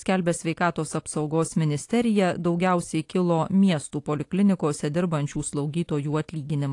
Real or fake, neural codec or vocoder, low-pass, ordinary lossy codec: real; none; 10.8 kHz; AAC, 48 kbps